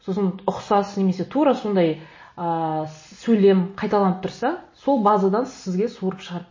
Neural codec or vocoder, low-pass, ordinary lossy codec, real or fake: none; 7.2 kHz; MP3, 32 kbps; real